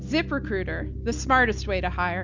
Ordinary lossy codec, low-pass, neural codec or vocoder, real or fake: MP3, 64 kbps; 7.2 kHz; none; real